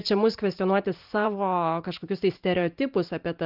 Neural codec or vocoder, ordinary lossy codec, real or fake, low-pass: vocoder, 44.1 kHz, 128 mel bands every 512 samples, BigVGAN v2; Opus, 32 kbps; fake; 5.4 kHz